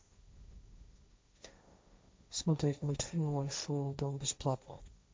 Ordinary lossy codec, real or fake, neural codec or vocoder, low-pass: none; fake; codec, 16 kHz, 1.1 kbps, Voila-Tokenizer; none